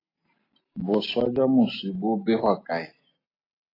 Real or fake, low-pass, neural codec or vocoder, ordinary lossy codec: real; 5.4 kHz; none; AAC, 24 kbps